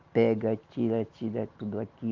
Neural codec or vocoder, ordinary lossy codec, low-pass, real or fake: none; Opus, 32 kbps; 7.2 kHz; real